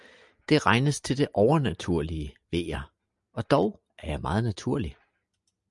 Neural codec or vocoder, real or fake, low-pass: none; real; 10.8 kHz